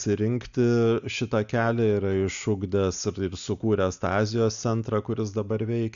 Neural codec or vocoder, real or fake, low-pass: none; real; 7.2 kHz